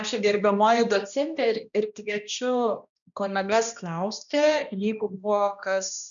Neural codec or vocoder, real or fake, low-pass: codec, 16 kHz, 1 kbps, X-Codec, HuBERT features, trained on balanced general audio; fake; 7.2 kHz